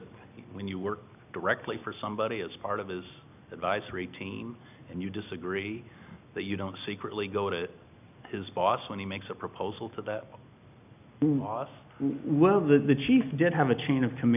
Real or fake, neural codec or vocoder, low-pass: real; none; 3.6 kHz